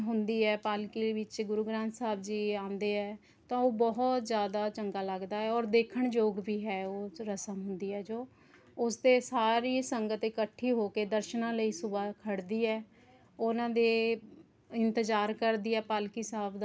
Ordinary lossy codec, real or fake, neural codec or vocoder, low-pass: none; real; none; none